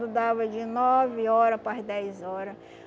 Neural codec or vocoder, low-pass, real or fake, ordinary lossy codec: none; none; real; none